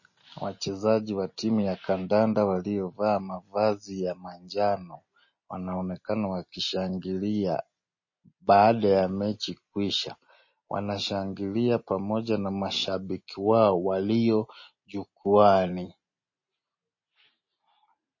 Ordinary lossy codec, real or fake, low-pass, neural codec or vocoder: MP3, 32 kbps; real; 7.2 kHz; none